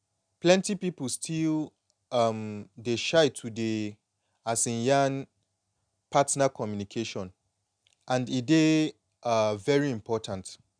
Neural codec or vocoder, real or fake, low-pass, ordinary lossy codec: none; real; 9.9 kHz; none